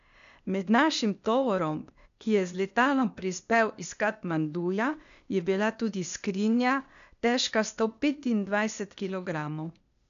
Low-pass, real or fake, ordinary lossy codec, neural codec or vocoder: 7.2 kHz; fake; none; codec, 16 kHz, 0.8 kbps, ZipCodec